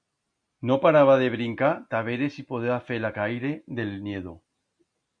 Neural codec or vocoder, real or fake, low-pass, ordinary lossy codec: none; real; 9.9 kHz; MP3, 64 kbps